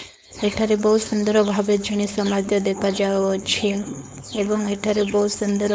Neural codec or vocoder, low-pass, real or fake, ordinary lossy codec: codec, 16 kHz, 4.8 kbps, FACodec; none; fake; none